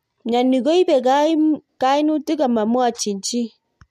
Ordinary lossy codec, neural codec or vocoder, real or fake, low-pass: MP3, 64 kbps; none; real; 14.4 kHz